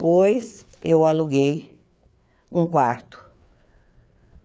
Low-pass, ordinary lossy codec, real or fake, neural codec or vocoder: none; none; fake; codec, 16 kHz, 4 kbps, FreqCodec, larger model